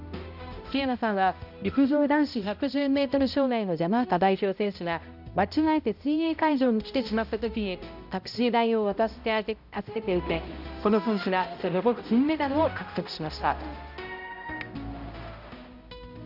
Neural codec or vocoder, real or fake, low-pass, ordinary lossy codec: codec, 16 kHz, 0.5 kbps, X-Codec, HuBERT features, trained on balanced general audio; fake; 5.4 kHz; none